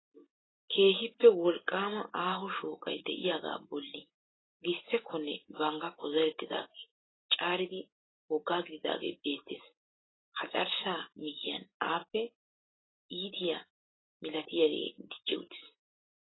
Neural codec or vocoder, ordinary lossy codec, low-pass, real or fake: none; AAC, 16 kbps; 7.2 kHz; real